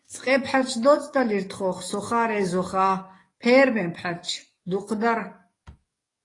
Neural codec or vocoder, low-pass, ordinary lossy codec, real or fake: codec, 44.1 kHz, 7.8 kbps, DAC; 10.8 kHz; AAC, 32 kbps; fake